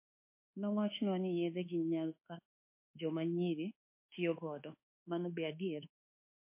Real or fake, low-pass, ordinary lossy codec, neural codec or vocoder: fake; 3.6 kHz; MP3, 24 kbps; codec, 24 kHz, 1.2 kbps, DualCodec